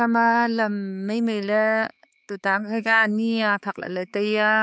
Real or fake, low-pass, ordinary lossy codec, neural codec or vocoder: fake; none; none; codec, 16 kHz, 4 kbps, X-Codec, HuBERT features, trained on balanced general audio